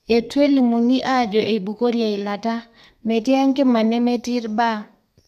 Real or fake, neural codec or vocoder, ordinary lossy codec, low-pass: fake; codec, 32 kHz, 1.9 kbps, SNAC; none; 14.4 kHz